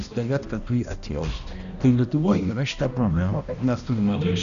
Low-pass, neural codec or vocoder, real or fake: 7.2 kHz; codec, 16 kHz, 1 kbps, X-Codec, HuBERT features, trained on balanced general audio; fake